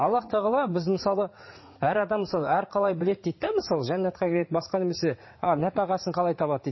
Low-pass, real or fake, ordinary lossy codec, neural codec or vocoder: 7.2 kHz; fake; MP3, 24 kbps; vocoder, 44.1 kHz, 80 mel bands, Vocos